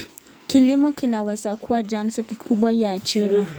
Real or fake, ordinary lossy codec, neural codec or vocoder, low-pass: fake; none; codec, 44.1 kHz, 2.6 kbps, SNAC; none